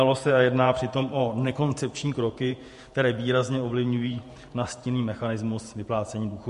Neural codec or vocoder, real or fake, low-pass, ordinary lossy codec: vocoder, 48 kHz, 128 mel bands, Vocos; fake; 14.4 kHz; MP3, 48 kbps